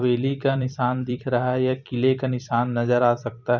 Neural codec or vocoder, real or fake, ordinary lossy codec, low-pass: vocoder, 44.1 kHz, 128 mel bands every 256 samples, BigVGAN v2; fake; none; 7.2 kHz